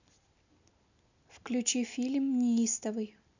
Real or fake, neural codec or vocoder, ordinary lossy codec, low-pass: real; none; none; 7.2 kHz